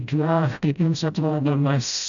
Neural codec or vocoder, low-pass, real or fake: codec, 16 kHz, 0.5 kbps, FreqCodec, smaller model; 7.2 kHz; fake